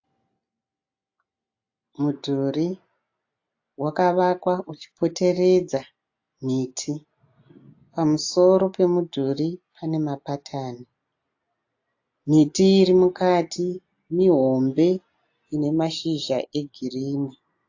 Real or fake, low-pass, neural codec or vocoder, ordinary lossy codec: real; 7.2 kHz; none; AAC, 48 kbps